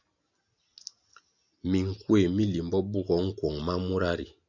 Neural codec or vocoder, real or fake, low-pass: none; real; 7.2 kHz